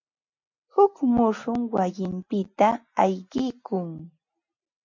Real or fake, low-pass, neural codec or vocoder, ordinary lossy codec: real; 7.2 kHz; none; MP3, 48 kbps